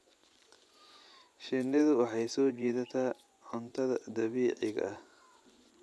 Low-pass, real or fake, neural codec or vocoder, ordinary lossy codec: none; fake; vocoder, 24 kHz, 100 mel bands, Vocos; none